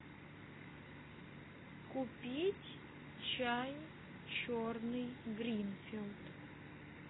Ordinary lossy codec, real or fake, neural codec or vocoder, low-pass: AAC, 16 kbps; real; none; 7.2 kHz